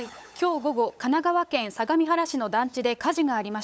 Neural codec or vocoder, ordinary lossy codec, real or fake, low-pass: codec, 16 kHz, 8 kbps, FunCodec, trained on LibriTTS, 25 frames a second; none; fake; none